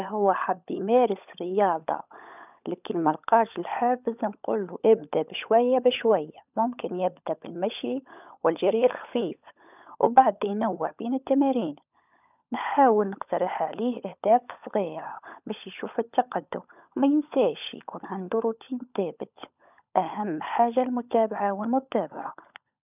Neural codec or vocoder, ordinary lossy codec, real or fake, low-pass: codec, 16 kHz, 16 kbps, FunCodec, trained on LibriTTS, 50 frames a second; none; fake; 3.6 kHz